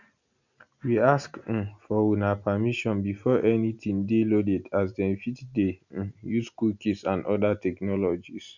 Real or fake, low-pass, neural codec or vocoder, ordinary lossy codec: real; 7.2 kHz; none; none